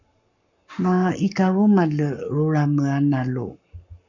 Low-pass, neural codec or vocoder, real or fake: 7.2 kHz; codec, 44.1 kHz, 7.8 kbps, Pupu-Codec; fake